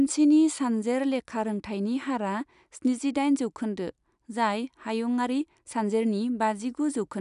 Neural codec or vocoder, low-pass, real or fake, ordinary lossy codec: none; 10.8 kHz; real; none